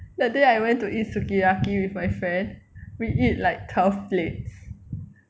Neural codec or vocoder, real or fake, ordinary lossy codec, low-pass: none; real; none; none